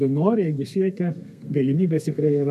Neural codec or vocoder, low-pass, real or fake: codec, 32 kHz, 1.9 kbps, SNAC; 14.4 kHz; fake